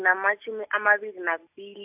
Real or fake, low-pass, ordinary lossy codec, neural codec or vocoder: real; 3.6 kHz; AAC, 32 kbps; none